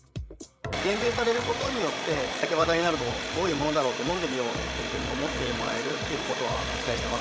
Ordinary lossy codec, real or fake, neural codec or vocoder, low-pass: none; fake; codec, 16 kHz, 16 kbps, FreqCodec, larger model; none